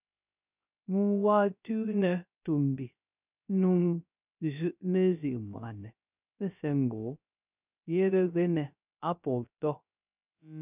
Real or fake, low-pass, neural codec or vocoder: fake; 3.6 kHz; codec, 16 kHz, 0.3 kbps, FocalCodec